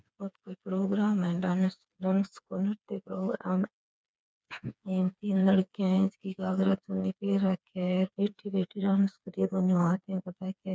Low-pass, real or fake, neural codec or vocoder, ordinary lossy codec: none; fake; codec, 16 kHz, 4 kbps, FreqCodec, smaller model; none